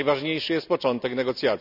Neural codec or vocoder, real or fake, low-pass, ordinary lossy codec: none; real; 5.4 kHz; none